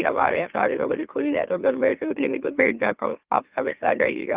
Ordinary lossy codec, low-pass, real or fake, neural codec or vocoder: Opus, 24 kbps; 3.6 kHz; fake; autoencoder, 44.1 kHz, a latent of 192 numbers a frame, MeloTTS